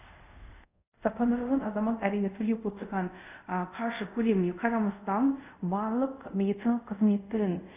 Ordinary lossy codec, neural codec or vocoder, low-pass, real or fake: none; codec, 24 kHz, 0.5 kbps, DualCodec; 3.6 kHz; fake